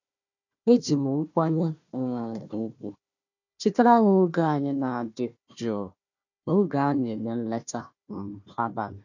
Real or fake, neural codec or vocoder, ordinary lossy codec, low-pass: fake; codec, 16 kHz, 1 kbps, FunCodec, trained on Chinese and English, 50 frames a second; none; 7.2 kHz